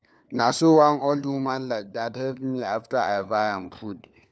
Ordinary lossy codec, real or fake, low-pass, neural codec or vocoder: none; fake; none; codec, 16 kHz, 4 kbps, FunCodec, trained on LibriTTS, 50 frames a second